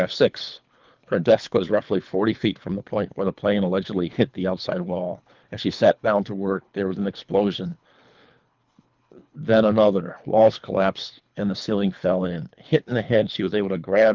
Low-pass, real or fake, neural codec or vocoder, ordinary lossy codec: 7.2 kHz; fake; codec, 24 kHz, 3 kbps, HILCodec; Opus, 24 kbps